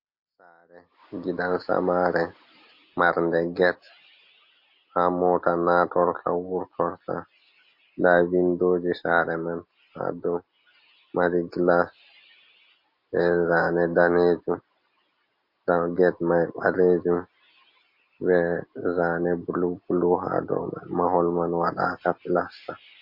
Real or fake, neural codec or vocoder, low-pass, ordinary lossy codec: real; none; 5.4 kHz; MP3, 32 kbps